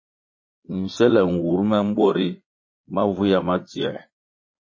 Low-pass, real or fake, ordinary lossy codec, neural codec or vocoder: 7.2 kHz; fake; MP3, 32 kbps; vocoder, 22.05 kHz, 80 mel bands, Vocos